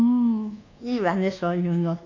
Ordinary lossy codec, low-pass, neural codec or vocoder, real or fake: none; 7.2 kHz; codec, 24 kHz, 1.2 kbps, DualCodec; fake